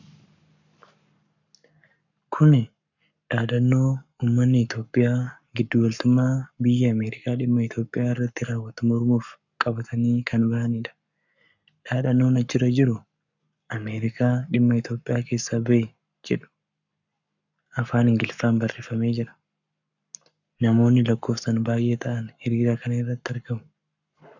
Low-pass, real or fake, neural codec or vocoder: 7.2 kHz; fake; codec, 44.1 kHz, 7.8 kbps, Pupu-Codec